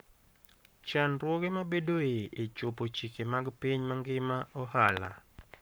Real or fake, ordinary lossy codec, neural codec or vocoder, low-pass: fake; none; codec, 44.1 kHz, 7.8 kbps, Pupu-Codec; none